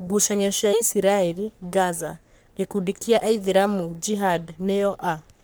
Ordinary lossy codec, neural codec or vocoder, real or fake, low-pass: none; codec, 44.1 kHz, 3.4 kbps, Pupu-Codec; fake; none